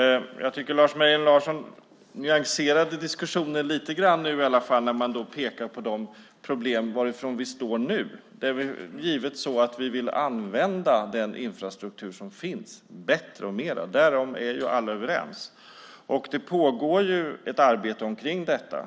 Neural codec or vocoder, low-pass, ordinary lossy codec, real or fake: none; none; none; real